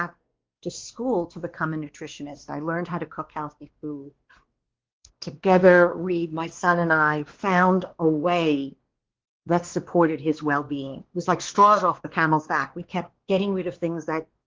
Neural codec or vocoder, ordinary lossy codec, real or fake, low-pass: codec, 16 kHz, 2 kbps, X-Codec, WavLM features, trained on Multilingual LibriSpeech; Opus, 16 kbps; fake; 7.2 kHz